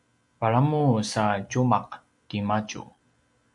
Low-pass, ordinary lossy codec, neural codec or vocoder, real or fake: 10.8 kHz; MP3, 64 kbps; none; real